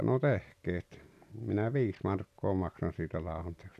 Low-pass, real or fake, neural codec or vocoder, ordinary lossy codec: 14.4 kHz; real; none; none